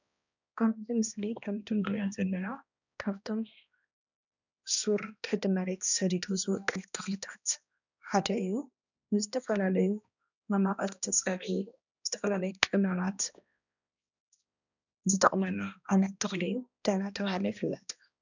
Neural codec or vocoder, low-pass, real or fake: codec, 16 kHz, 1 kbps, X-Codec, HuBERT features, trained on balanced general audio; 7.2 kHz; fake